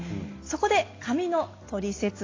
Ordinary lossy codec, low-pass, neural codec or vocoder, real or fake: AAC, 32 kbps; 7.2 kHz; none; real